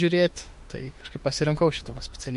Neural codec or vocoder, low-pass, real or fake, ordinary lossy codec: autoencoder, 48 kHz, 32 numbers a frame, DAC-VAE, trained on Japanese speech; 14.4 kHz; fake; MP3, 48 kbps